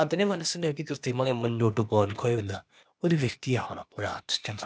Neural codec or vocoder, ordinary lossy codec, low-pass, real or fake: codec, 16 kHz, 0.8 kbps, ZipCodec; none; none; fake